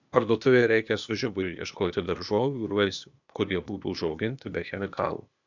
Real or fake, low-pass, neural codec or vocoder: fake; 7.2 kHz; codec, 16 kHz, 0.8 kbps, ZipCodec